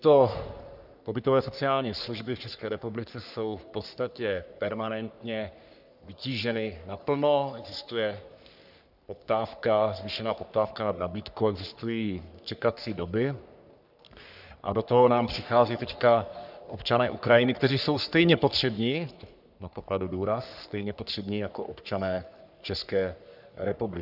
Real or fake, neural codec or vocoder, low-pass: fake; codec, 44.1 kHz, 3.4 kbps, Pupu-Codec; 5.4 kHz